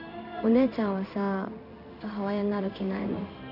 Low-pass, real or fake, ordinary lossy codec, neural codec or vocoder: 5.4 kHz; fake; none; codec, 16 kHz in and 24 kHz out, 1 kbps, XY-Tokenizer